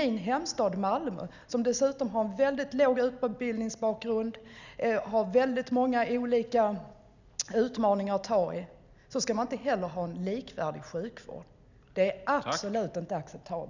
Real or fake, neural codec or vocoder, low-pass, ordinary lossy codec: real; none; 7.2 kHz; none